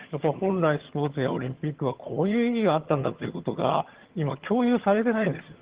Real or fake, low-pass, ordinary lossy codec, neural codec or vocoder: fake; 3.6 kHz; Opus, 16 kbps; vocoder, 22.05 kHz, 80 mel bands, HiFi-GAN